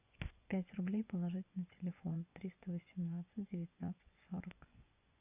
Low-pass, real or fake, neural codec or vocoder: 3.6 kHz; fake; vocoder, 24 kHz, 100 mel bands, Vocos